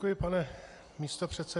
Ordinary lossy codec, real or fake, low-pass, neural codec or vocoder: AAC, 64 kbps; real; 10.8 kHz; none